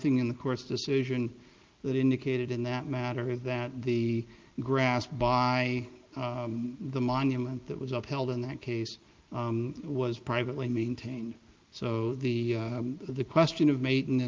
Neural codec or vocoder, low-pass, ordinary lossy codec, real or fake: none; 7.2 kHz; Opus, 32 kbps; real